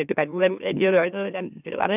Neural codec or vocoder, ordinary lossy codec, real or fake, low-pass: autoencoder, 44.1 kHz, a latent of 192 numbers a frame, MeloTTS; none; fake; 3.6 kHz